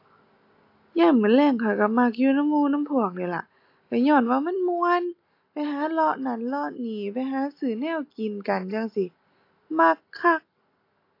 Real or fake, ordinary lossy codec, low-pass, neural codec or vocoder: real; AAC, 32 kbps; 5.4 kHz; none